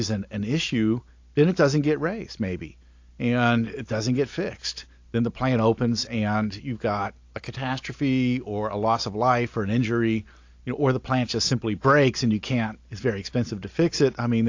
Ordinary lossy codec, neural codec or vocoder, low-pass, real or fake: AAC, 48 kbps; none; 7.2 kHz; real